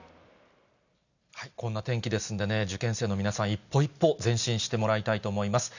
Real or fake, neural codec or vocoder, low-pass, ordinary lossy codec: real; none; 7.2 kHz; none